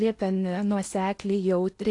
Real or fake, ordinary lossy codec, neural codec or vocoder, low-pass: fake; AAC, 48 kbps; codec, 16 kHz in and 24 kHz out, 0.8 kbps, FocalCodec, streaming, 65536 codes; 10.8 kHz